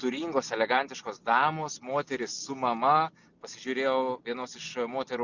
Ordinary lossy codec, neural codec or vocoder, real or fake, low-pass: Opus, 64 kbps; none; real; 7.2 kHz